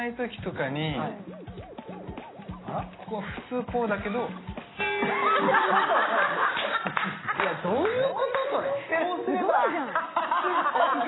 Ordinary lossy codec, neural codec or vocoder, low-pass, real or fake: AAC, 16 kbps; none; 7.2 kHz; real